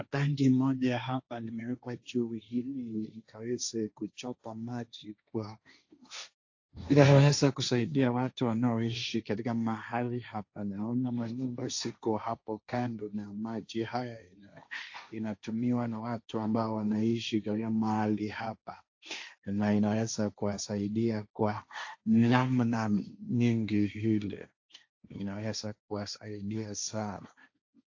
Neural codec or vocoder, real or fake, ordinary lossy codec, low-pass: codec, 16 kHz, 1.1 kbps, Voila-Tokenizer; fake; AAC, 48 kbps; 7.2 kHz